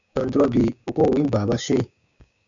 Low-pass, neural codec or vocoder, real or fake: 7.2 kHz; codec, 16 kHz, 6 kbps, DAC; fake